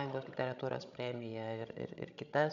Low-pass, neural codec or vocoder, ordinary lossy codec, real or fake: 7.2 kHz; codec, 16 kHz, 16 kbps, FreqCodec, larger model; MP3, 96 kbps; fake